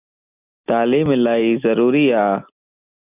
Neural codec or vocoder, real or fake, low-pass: none; real; 3.6 kHz